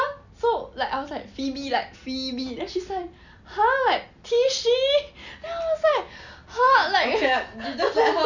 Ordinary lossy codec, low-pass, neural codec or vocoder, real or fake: none; 7.2 kHz; none; real